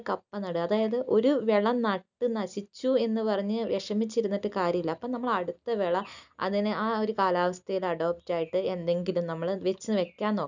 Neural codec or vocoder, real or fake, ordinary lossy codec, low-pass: none; real; none; 7.2 kHz